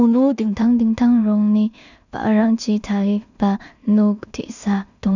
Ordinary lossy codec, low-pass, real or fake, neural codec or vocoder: none; 7.2 kHz; fake; codec, 16 kHz in and 24 kHz out, 0.4 kbps, LongCat-Audio-Codec, two codebook decoder